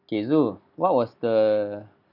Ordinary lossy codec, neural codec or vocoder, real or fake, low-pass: none; none; real; 5.4 kHz